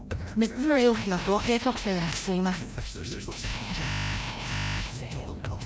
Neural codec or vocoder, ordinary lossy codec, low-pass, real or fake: codec, 16 kHz, 0.5 kbps, FreqCodec, larger model; none; none; fake